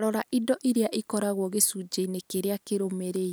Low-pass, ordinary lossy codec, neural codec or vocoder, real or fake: none; none; none; real